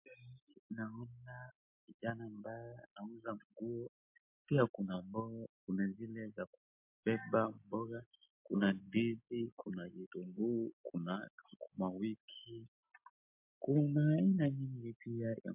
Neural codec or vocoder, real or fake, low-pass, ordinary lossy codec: none; real; 3.6 kHz; MP3, 32 kbps